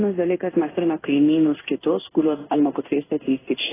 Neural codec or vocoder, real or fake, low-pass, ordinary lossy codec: codec, 16 kHz in and 24 kHz out, 1 kbps, XY-Tokenizer; fake; 3.6 kHz; AAC, 16 kbps